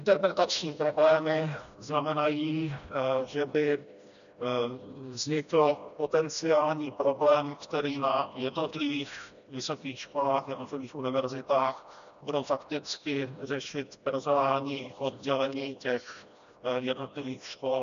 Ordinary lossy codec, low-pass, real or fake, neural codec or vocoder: MP3, 96 kbps; 7.2 kHz; fake; codec, 16 kHz, 1 kbps, FreqCodec, smaller model